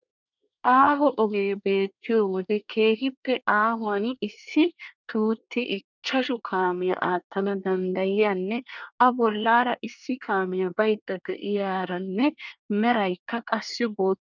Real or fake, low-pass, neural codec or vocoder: fake; 7.2 kHz; codec, 24 kHz, 1 kbps, SNAC